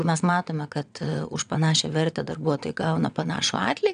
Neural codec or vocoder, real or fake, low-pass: vocoder, 22.05 kHz, 80 mel bands, WaveNeXt; fake; 9.9 kHz